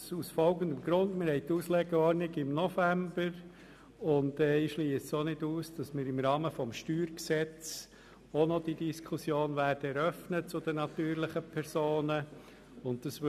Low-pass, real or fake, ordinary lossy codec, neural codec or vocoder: 14.4 kHz; real; none; none